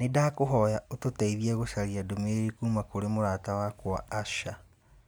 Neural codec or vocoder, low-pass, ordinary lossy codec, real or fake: none; none; none; real